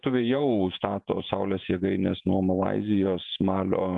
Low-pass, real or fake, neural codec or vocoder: 10.8 kHz; fake; vocoder, 48 kHz, 128 mel bands, Vocos